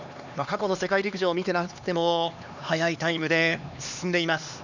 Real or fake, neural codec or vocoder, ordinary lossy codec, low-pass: fake; codec, 16 kHz, 2 kbps, X-Codec, HuBERT features, trained on LibriSpeech; none; 7.2 kHz